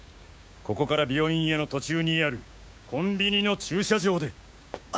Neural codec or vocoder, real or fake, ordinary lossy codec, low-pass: codec, 16 kHz, 6 kbps, DAC; fake; none; none